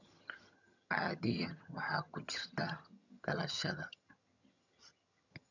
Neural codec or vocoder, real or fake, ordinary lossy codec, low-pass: vocoder, 22.05 kHz, 80 mel bands, HiFi-GAN; fake; none; 7.2 kHz